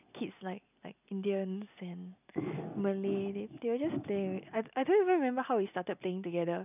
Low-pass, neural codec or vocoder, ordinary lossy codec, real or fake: 3.6 kHz; none; none; real